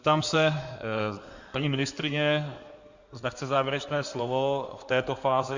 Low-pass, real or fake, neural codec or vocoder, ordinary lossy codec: 7.2 kHz; fake; codec, 16 kHz in and 24 kHz out, 2.2 kbps, FireRedTTS-2 codec; Opus, 64 kbps